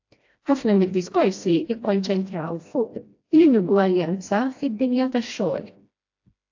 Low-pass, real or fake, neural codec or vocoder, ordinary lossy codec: 7.2 kHz; fake; codec, 16 kHz, 1 kbps, FreqCodec, smaller model; AAC, 48 kbps